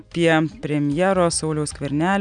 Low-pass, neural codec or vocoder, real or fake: 9.9 kHz; none; real